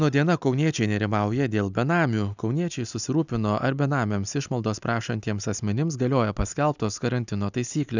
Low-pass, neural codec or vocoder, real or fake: 7.2 kHz; none; real